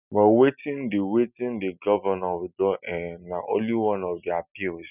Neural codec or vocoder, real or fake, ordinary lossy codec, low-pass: none; real; none; 3.6 kHz